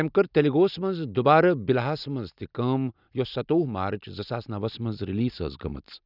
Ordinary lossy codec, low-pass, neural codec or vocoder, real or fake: none; 5.4 kHz; none; real